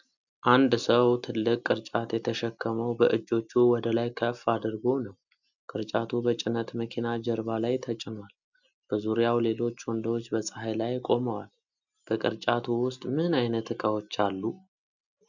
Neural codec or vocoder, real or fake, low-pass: none; real; 7.2 kHz